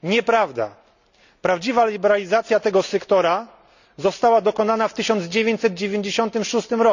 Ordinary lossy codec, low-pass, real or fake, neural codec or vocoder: none; 7.2 kHz; real; none